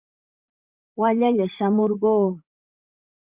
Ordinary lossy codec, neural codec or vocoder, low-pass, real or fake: Opus, 64 kbps; vocoder, 44.1 kHz, 128 mel bands, Pupu-Vocoder; 3.6 kHz; fake